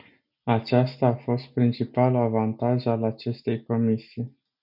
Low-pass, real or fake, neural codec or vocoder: 5.4 kHz; real; none